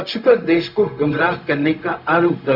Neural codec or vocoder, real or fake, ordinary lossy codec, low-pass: codec, 16 kHz, 0.4 kbps, LongCat-Audio-Codec; fake; none; 5.4 kHz